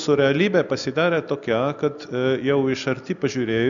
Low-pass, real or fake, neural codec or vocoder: 7.2 kHz; real; none